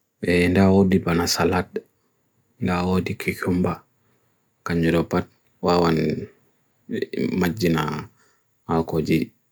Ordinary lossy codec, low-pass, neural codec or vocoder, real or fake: none; none; none; real